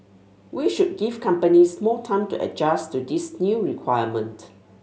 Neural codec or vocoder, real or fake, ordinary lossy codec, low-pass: none; real; none; none